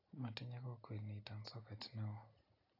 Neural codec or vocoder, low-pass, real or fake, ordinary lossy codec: none; 5.4 kHz; real; AAC, 24 kbps